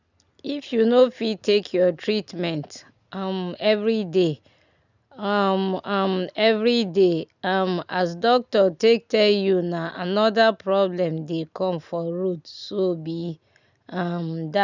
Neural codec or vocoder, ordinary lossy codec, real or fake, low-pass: none; none; real; 7.2 kHz